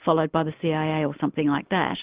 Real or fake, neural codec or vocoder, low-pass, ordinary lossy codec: real; none; 3.6 kHz; Opus, 32 kbps